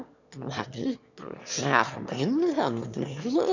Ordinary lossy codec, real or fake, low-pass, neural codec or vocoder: none; fake; 7.2 kHz; autoencoder, 22.05 kHz, a latent of 192 numbers a frame, VITS, trained on one speaker